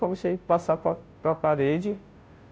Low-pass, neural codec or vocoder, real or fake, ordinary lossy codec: none; codec, 16 kHz, 0.5 kbps, FunCodec, trained on Chinese and English, 25 frames a second; fake; none